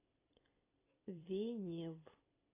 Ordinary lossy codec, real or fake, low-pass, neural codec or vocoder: AAC, 24 kbps; fake; 3.6 kHz; vocoder, 44.1 kHz, 128 mel bands every 256 samples, BigVGAN v2